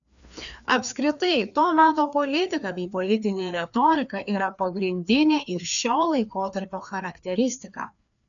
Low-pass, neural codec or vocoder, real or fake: 7.2 kHz; codec, 16 kHz, 2 kbps, FreqCodec, larger model; fake